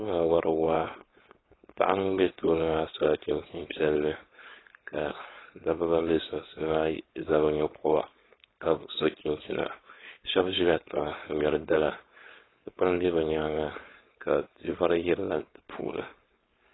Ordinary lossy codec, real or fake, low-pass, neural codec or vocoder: AAC, 16 kbps; fake; 7.2 kHz; codec, 16 kHz, 4.8 kbps, FACodec